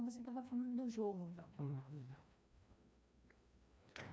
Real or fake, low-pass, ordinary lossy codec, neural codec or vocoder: fake; none; none; codec, 16 kHz, 1 kbps, FreqCodec, larger model